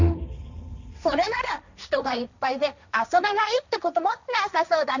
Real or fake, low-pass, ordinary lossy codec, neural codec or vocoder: fake; 7.2 kHz; none; codec, 16 kHz, 1.1 kbps, Voila-Tokenizer